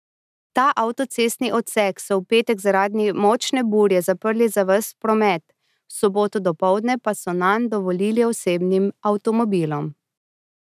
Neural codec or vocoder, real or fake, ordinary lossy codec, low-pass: none; real; none; 14.4 kHz